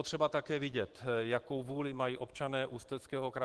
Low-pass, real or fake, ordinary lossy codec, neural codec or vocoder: 10.8 kHz; fake; Opus, 16 kbps; autoencoder, 48 kHz, 128 numbers a frame, DAC-VAE, trained on Japanese speech